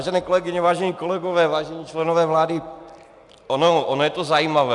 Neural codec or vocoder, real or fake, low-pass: none; real; 10.8 kHz